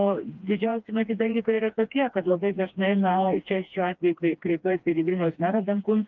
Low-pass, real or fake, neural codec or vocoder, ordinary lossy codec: 7.2 kHz; fake; codec, 16 kHz, 2 kbps, FreqCodec, smaller model; Opus, 32 kbps